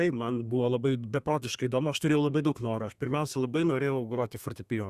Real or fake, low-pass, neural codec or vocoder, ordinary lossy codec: fake; 14.4 kHz; codec, 32 kHz, 1.9 kbps, SNAC; Opus, 64 kbps